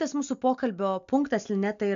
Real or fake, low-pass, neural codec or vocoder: real; 7.2 kHz; none